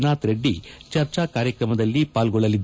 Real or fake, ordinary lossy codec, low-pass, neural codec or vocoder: real; none; none; none